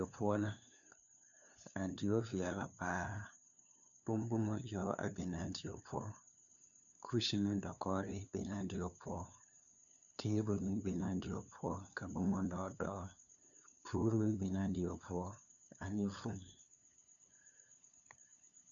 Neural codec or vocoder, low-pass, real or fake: codec, 16 kHz, 2 kbps, FunCodec, trained on LibriTTS, 25 frames a second; 7.2 kHz; fake